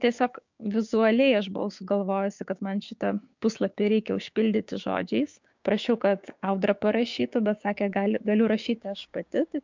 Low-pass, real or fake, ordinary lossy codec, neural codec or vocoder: 7.2 kHz; fake; MP3, 64 kbps; codec, 16 kHz, 6 kbps, DAC